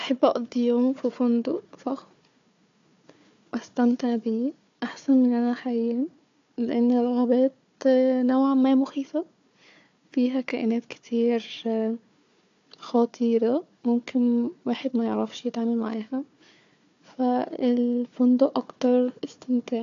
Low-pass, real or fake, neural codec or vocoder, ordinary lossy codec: 7.2 kHz; fake; codec, 16 kHz, 4 kbps, FunCodec, trained on Chinese and English, 50 frames a second; AAC, 64 kbps